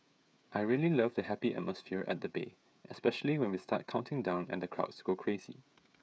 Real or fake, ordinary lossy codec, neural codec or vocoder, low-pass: fake; none; codec, 16 kHz, 16 kbps, FreqCodec, smaller model; none